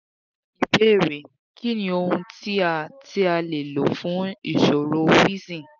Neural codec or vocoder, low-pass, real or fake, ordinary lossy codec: none; 7.2 kHz; real; none